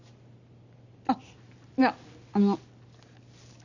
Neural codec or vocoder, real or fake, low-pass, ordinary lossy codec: none; real; 7.2 kHz; none